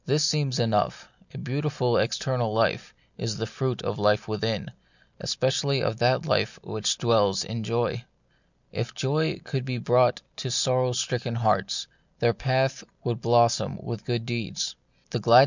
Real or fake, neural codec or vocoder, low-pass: real; none; 7.2 kHz